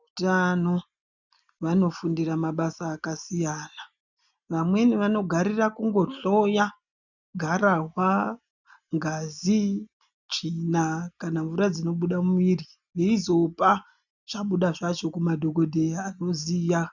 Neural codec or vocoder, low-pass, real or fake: none; 7.2 kHz; real